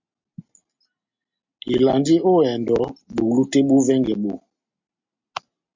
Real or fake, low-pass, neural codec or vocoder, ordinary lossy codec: real; 7.2 kHz; none; MP3, 48 kbps